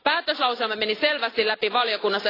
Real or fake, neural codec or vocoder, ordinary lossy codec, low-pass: real; none; AAC, 24 kbps; 5.4 kHz